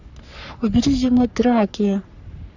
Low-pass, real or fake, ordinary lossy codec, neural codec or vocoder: 7.2 kHz; fake; none; codec, 44.1 kHz, 3.4 kbps, Pupu-Codec